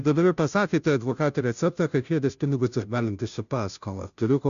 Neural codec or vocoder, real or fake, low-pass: codec, 16 kHz, 0.5 kbps, FunCodec, trained on Chinese and English, 25 frames a second; fake; 7.2 kHz